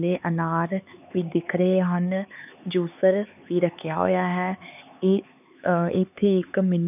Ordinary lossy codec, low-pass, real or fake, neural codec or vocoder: none; 3.6 kHz; fake; codec, 16 kHz, 4 kbps, X-Codec, HuBERT features, trained on LibriSpeech